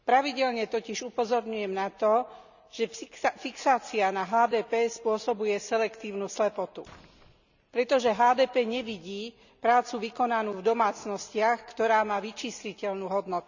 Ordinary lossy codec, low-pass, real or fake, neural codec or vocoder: none; 7.2 kHz; real; none